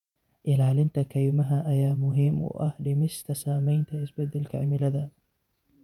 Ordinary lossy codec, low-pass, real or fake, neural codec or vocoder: none; 19.8 kHz; fake; vocoder, 48 kHz, 128 mel bands, Vocos